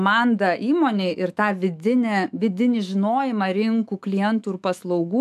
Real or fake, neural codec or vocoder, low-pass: fake; autoencoder, 48 kHz, 128 numbers a frame, DAC-VAE, trained on Japanese speech; 14.4 kHz